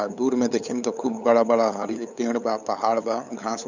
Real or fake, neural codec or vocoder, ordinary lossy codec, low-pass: fake; codec, 16 kHz, 8 kbps, FunCodec, trained on LibriTTS, 25 frames a second; none; 7.2 kHz